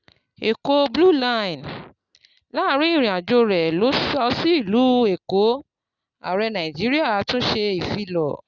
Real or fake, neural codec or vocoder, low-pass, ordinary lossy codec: real; none; 7.2 kHz; Opus, 64 kbps